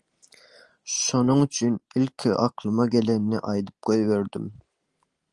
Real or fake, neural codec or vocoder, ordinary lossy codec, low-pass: real; none; Opus, 24 kbps; 10.8 kHz